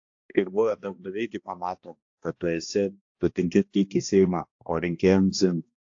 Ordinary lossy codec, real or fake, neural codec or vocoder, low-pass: AAC, 48 kbps; fake; codec, 16 kHz, 1 kbps, X-Codec, HuBERT features, trained on balanced general audio; 7.2 kHz